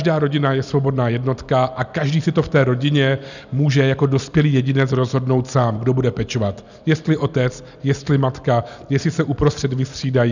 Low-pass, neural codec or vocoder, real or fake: 7.2 kHz; none; real